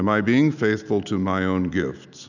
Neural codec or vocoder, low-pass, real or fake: none; 7.2 kHz; real